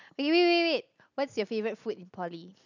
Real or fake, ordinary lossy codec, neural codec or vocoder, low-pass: real; none; none; 7.2 kHz